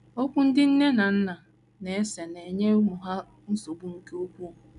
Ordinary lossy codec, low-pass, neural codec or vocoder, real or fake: none; 10.8 kHz; none; real